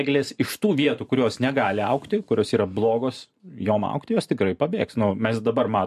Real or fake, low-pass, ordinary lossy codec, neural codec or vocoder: fake; 14.4 kHz; MP3, 64 kbps; vocoder, 44.1 kHz, 128 mel bands every 512 samples, BigVGAN v2